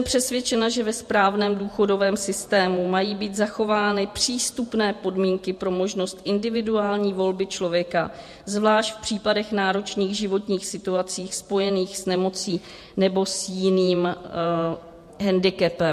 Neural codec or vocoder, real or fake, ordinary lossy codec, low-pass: vocoder, 48 kHz, 128 mel bands, Vocos; fake; MP3, 64 kbps; 14.4 kHz